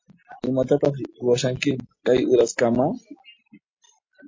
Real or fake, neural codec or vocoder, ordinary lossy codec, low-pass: real; none; MP3, 32 kbps; 7.2 kHz